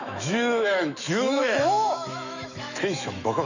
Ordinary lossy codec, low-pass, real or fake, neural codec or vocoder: none; 7.2 kHz; fake; autoencoder, 48 kHz, 128 numbers a frame, DAC-VAE, trained on Japanese speech